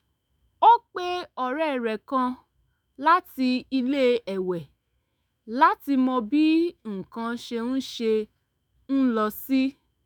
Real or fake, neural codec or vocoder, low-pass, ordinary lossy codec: fake; autoencoder, 48 kHz, 128 numbers a frame, DAC-VAE, trained on Japanese speech; none; none